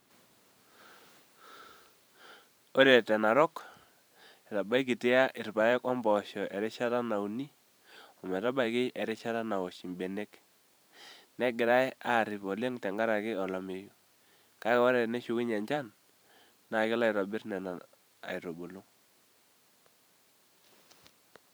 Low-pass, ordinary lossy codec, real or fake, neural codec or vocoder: none; none; fake; vocoder, 44.1 kHz, 128 mel bands every 512 samples, BigVGAN v2